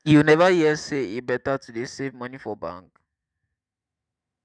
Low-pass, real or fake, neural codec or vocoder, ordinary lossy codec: 9.9 kHz; fake; autoencoder, 48 kHz, 128 numbers a frame, DAC-VAE, trained on Japanese speech; none